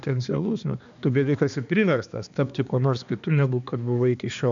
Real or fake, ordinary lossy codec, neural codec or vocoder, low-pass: fake; MP3, 64 kbps; codec, 16 kHz, 2 kbps, X-Codec, HuBERT features, trained on balanced general audio; 7.2 kHz